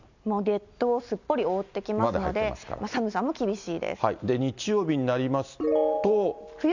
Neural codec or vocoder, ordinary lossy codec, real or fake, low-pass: none; none; real; 7.2 kHz